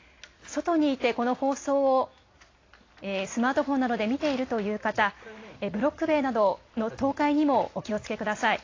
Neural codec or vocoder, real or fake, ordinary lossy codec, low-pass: none; real; AAC, 32 kbps; 7.2 kHz